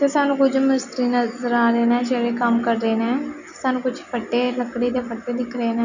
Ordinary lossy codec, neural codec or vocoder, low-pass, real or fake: none; none; 7.2 kHz; real